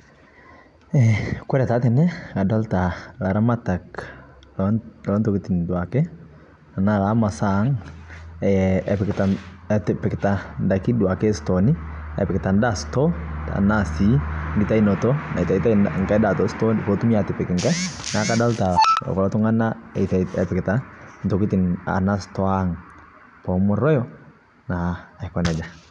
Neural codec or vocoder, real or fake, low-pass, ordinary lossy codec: none; real; 10.8 kHz; none